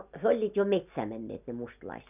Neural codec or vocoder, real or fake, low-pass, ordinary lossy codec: none; real; 3.6 kHz; none